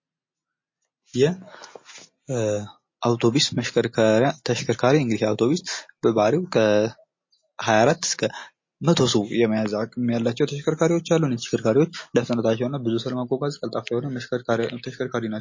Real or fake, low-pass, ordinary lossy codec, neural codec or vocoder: real; 7.2 kHz; MP3, 32 kbps; none